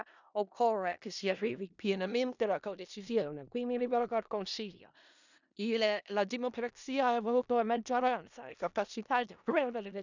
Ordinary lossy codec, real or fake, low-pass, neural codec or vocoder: none; fake; 7.2 kHz; codec, 16 kHz in and 24 kHz out, 0.4 kbps, LongCat-Audio-Codec, four codebook decoder